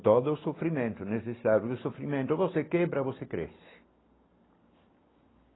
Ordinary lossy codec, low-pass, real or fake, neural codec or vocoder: AAC, 16 kbps; 7.2 kHz; real; none